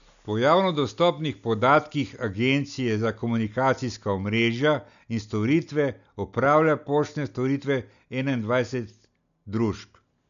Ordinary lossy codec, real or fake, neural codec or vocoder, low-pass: none; real; none; 7.2 kHz